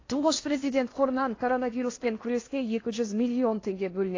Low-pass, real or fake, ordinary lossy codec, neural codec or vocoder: 7.2 kHz; fake; AAC, 32 kbps; codec, 16 kHz in and 24 kHz out, 0.8 kbps, FocalCodec, streaming, 65536 codes